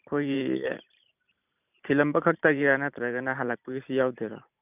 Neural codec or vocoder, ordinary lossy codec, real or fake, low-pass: vocoder, 44.1 kHz, 128 mel bands every 512 samples, BigVGAN v2; none; fake; 3.6 kHz